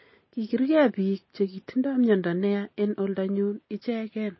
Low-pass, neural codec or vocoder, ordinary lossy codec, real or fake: 7.2 kHz; none; MP3, 24 kbps; real